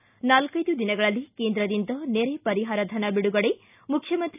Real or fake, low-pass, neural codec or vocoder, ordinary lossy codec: real; 3.6 kHz; none; none